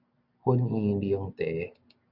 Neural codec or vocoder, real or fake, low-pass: none; real; 5.4 kHz